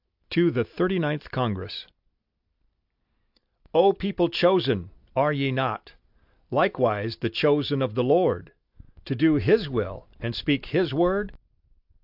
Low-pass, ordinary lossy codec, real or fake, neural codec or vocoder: 5.4 kHz; AAC, 48 kbps; real; none